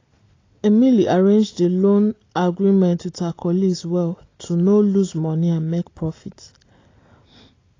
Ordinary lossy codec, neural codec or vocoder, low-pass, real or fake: AAC, 32 kbps; none; 7.2 kHz; real